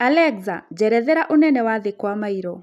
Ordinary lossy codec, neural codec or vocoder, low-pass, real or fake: none; none; 14.4 kHz; real